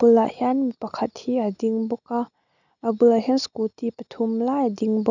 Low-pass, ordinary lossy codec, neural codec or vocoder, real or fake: 7.2 kHz; none; none; real